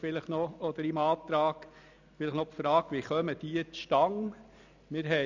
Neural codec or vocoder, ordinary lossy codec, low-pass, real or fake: none; none; 7.2 kHz; real